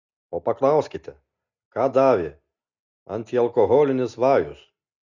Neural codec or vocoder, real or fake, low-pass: none; real; 7.2 kHz